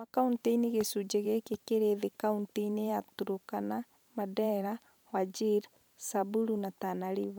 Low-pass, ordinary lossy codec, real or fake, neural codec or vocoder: none; none; fake; vocoder, 44.1 kHz, 128 mel bands every 512 samples, BigVGAN v2